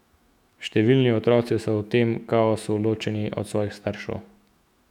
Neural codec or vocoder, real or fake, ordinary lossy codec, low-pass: autoencoder, 48 kHz, 128 numbers a frame, DAC-VAE, trained on Japanese speech; fake; none; 19.8 kHz